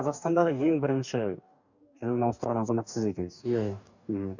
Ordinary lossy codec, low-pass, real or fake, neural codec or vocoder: none; 7.2 kHz; fake; codec, 44.1 kHz, 2.6 kbps, DAC